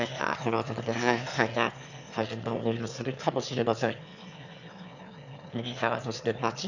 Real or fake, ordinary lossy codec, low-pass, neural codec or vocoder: fake; none; 7.2 kHz; autoencoder, 22.05 kHz, a latent of 192 numbers a frame, VITS, trained on one speaker